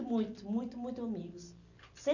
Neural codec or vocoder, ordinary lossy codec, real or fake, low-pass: none; none; real; 7.2 kHz